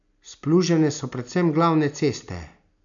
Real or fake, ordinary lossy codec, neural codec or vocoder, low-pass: real; none; none; 7.2 kHz